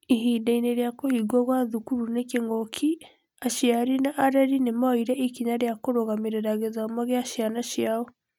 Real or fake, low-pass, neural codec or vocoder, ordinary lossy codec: real; 19.8 kHz; none; none